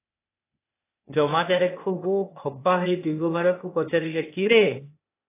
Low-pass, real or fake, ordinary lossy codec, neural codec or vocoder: 3.6 kHz; fake; AAC, 24 kbps; codec, 16 kHz, 0.8 kbps, ZipCodec